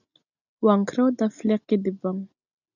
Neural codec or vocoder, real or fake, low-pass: none; real; 7.2 kHz